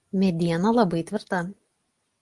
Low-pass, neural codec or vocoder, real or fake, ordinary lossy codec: 10.8 kHz; none; real; Opus, 24 kbps